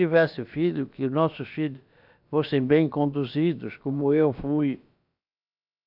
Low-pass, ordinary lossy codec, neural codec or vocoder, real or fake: 5.4 kHz; none; codec, 16 kHz, about 1 kbps, DyCAST, with the encoder's durations; fake